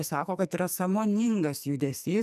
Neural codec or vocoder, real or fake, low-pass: codec, 44.1 kHz, 2.6 kbps, SNAC; fake; 14.4 kHz